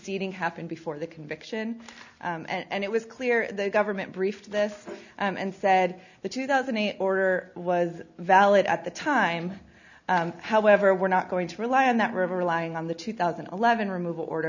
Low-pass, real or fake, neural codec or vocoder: 7.2 kHz; real; none